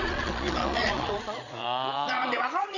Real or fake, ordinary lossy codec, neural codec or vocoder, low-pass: fake; none; vocoder, 22.05 kHz, 80 mel bands, Vocos; 7.2 kHz